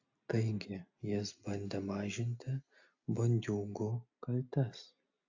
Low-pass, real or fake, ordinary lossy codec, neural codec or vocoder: 7.2 kHz; real; AAC, 48 kbps; none